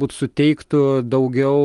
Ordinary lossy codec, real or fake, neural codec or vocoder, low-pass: Opus, 32 kbps; real; none; 10.8 kHz